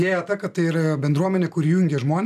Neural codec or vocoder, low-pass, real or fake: none; 14.4 kHz; real